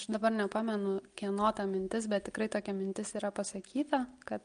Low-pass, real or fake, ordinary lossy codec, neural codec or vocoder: 9.9 kHz; real; Opus, 32 kbps; none